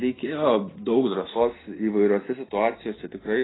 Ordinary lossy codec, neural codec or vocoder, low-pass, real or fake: AAC, 16 kbps; none; 7.2 kHz; real